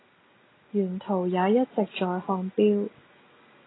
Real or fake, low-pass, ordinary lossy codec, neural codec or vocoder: real; 7.2 kHz; AAC, 16 kbps; none